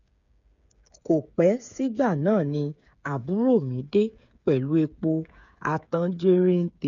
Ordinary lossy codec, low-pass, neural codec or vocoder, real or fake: none; 7.2 kHz; codec, 16 kHz, 8 kbps, FreqCodec, smaller model; fake